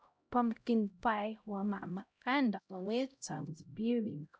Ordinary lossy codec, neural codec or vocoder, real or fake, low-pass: none; codec, 16 kHz, 0.5 kbps, X-Codec, HuBERT features, trained on LibriSpeech; fake; none